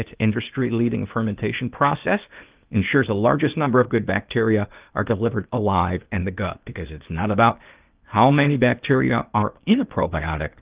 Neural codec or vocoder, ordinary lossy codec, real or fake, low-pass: codec, 16 kHz, 0.8 kbps, ZipCodec; Opus, 32 kbps; fake; 3.6 kHz